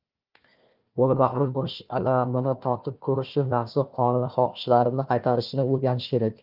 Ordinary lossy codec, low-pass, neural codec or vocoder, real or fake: Opus, 32 kbps; 5.4 kHz; codec, 16 kHz, 1 kbps, FunCodec, trained on Chinese and English, 50 frames a second; fake